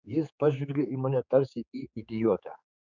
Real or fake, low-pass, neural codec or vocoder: fake; 7.2 kHz; codec, 16 kHz, 4 kbps, X-Codec, HuBERT features, trained on general audio